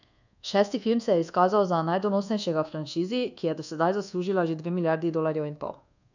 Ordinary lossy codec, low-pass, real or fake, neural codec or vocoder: none; 7.2 kHz; fake; codec, 24 kHz, 1.2 kbps, DualCodec